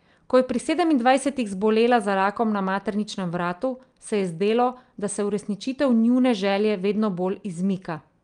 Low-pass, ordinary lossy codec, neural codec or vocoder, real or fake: 9.9 kHz; Opus, 32 kbps; none; real